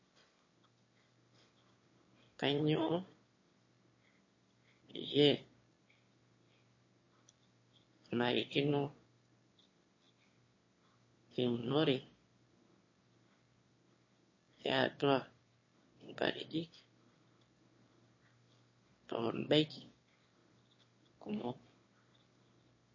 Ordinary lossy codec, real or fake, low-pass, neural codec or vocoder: MP3, 32 kbps; fake; 7.2 kHz; autoencoder, 22.05 kHz, a latent of 192 numbers a frame, VITS, trained on one speaker